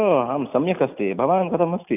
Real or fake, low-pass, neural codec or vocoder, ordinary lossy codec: real; 3.6 kHz; none; none